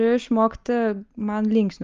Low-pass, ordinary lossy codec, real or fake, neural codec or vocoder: 7.2 kHz; Opus, 32 kbps; real; none